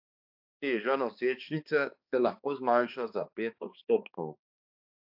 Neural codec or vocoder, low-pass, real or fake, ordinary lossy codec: codec, 16 kHz, 2 kbps, X-Codec, HuBERT features, trained on balanced general audio; 5.4 kHz; fake; AAC, 48 kbps